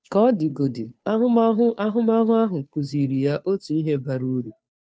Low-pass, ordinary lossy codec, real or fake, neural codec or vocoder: none; none; fake; codec, 16 kHz, 2 kbps, FunCodec, trained on Chinese and English, 25 frames a second